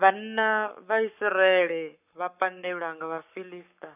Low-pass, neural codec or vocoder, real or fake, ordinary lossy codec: 3.6 kHz; codec, 44.1 kHz, 7.8 kbps, Pupu-Codec; fake; none